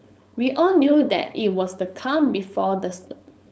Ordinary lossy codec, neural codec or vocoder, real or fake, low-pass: none; codec, 16 kHz, 4.8 kbps, FACodec; fake; none